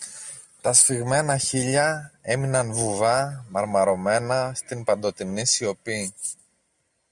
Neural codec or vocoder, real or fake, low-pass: none; real; 10.8 kHz